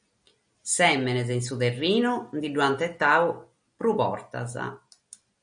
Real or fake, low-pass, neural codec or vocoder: real; 9.9 kHz; none